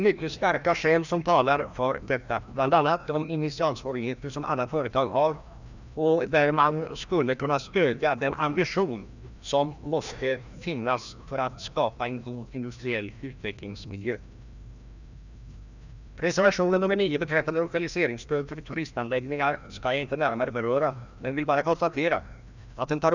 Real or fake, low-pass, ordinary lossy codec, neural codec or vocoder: fake; 7.2 kHz; none; codec, 16 kHz, 1 kbps, FreqCodec, larger model